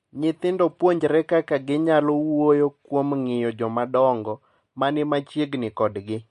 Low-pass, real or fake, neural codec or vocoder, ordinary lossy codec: 14.4 kHz; real; none; MP3, 48 kbps